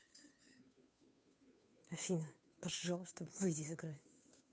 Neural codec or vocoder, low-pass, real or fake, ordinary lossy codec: codec, 16 kHz, 2 kbps, FunCodec, trained on Chinese and English, 25 frames a second; none; fake; none